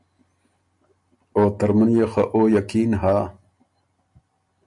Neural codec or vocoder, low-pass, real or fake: none; 10.8 kHz; real